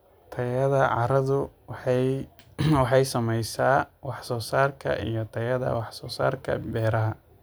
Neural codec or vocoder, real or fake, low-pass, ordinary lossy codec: none; real; none; none